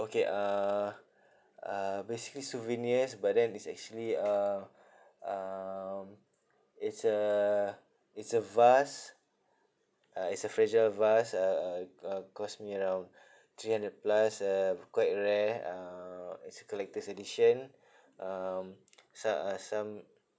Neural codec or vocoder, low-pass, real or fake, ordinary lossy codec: none; none; real; none